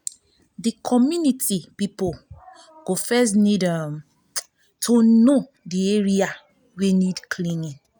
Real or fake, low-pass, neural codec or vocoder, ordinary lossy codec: real; none; none; none